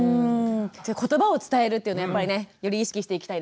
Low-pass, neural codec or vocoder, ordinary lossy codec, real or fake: none; none; none; real